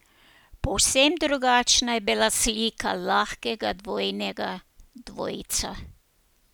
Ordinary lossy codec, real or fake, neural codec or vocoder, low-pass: none; real; none; none